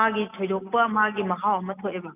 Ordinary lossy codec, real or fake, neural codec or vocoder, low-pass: none; real; none; 3.6 kHz